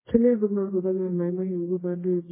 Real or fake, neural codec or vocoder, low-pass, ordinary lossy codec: fake; codec, 24 kHz, 0.9 kbps, WavTokenizer, medium music audio release; 3.6 kHz; MP3, 16 kbps